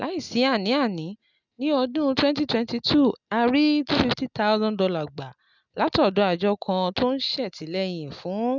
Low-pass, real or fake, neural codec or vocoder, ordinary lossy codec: 7.2 kHz; real; none; none